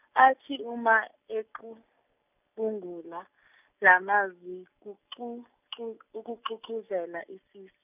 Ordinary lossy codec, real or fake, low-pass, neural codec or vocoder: none; real; 3.6 kHz; none